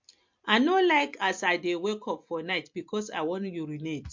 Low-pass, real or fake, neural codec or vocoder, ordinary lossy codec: 7.2 kHz; real; none; MP3, 48 kbps